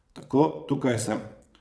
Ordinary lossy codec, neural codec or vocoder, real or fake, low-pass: none; vocoder, 22.05 kHz, 80 mel bands, WaveNeXt; fake; none